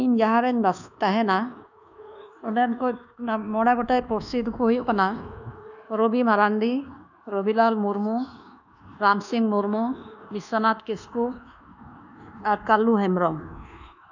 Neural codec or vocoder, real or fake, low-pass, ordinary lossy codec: codec, 24 kHz, 1.2 kbps, DualCodec; fake; 7.2 kHz; none